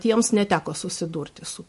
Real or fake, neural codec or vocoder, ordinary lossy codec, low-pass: real; none; MP3, 48 kbps; 14.4 kHz